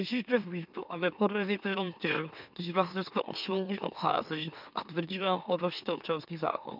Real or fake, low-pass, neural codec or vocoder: fake; 5.4 kHz; autoencoder, 44.1 kHz, a latent of 192 numbers a frame, MeloTTS